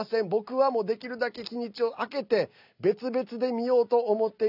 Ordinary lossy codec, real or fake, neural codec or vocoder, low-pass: none; real; none; 5.4 kHz